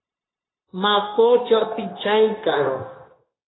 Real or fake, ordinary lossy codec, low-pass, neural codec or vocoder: fake; AAC, 16 kbps; 7.2 kHz; codec, 16 kHz, 0.9 kbps, LongCat-Audio-Codec